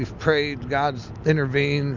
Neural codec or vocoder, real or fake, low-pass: none; real; 7.2 kHz